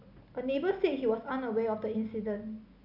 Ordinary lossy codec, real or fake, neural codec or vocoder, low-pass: none; real; none; 5.4 kHz